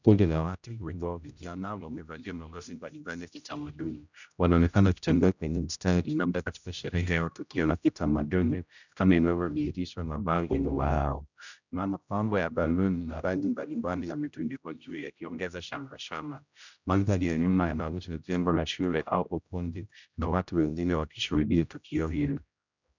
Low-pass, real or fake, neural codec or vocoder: 7.2 kHz; fake; codec, 16 kHz, 0.5 kbps, X-Codec, HuBERT features, trained on general audio